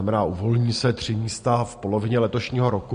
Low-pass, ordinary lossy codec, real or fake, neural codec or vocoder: 9.9 kHz; MP3, 48 kbps; real; none